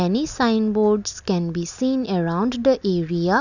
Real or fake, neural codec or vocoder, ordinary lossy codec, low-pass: real; none; none; 7.2 kHz